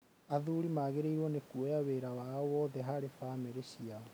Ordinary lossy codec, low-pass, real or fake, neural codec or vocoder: none; none; real; none